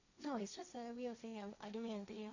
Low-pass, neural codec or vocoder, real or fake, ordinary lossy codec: 7.2 kHz; codec, 16 kHz, 1.1 kbps, Voila-Tokenizer; fake; none